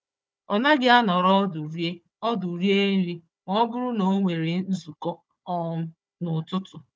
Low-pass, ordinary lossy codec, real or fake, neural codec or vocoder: none; none; fake; codec, 16 kHz, 4 kbps, FunCodec, trained on Chinese and English, 50 frames a second